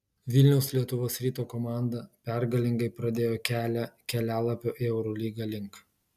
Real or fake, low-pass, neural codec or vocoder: real; 14.4 kHz; none